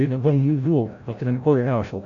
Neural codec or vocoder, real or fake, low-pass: codec, 16 kHz, 0.5 kbps, FreqCodec, larger model; fake; 7.2 kHz